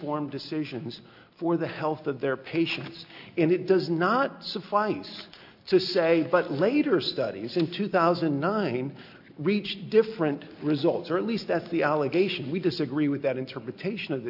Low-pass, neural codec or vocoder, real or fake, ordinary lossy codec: 5.4 kHz; none; real; AAC, 48 kbps